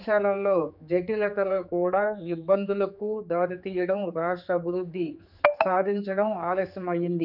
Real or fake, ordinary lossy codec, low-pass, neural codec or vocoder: fake; none; 5.4 kHz; codec, 16 kHz, 4 kbps, X-Codec, HuBERT features, trained on general audio